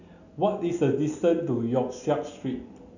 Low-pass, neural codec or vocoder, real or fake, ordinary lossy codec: 7.2 kHz; none; real; none